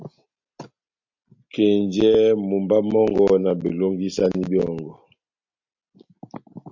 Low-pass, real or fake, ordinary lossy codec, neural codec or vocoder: 7.2 kHz; real; MP3, 48 kbps; none